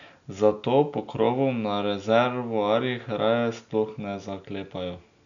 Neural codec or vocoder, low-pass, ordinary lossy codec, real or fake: none; 7.2 kHz; none; real